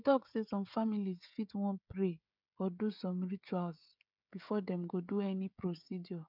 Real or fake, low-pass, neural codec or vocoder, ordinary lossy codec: fake; 5.4 kHz; codec, 16 kHz, 16 kbps, FreqCodec, smaller model; none